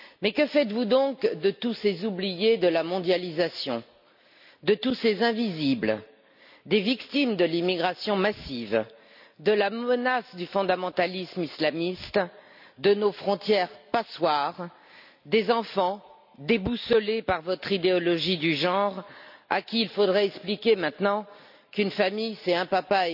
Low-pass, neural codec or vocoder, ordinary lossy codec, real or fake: 5.4 kHz; none; none; real